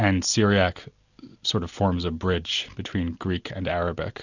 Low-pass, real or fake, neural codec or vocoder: 7.2 kHz; real; none